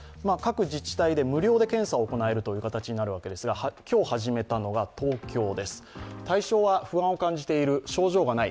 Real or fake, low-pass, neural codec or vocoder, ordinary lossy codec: real; none; none; none